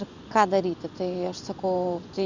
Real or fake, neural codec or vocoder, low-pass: fake; vocoder, 24 kHz, 100 mel bands, Vocos; 7.2 kHz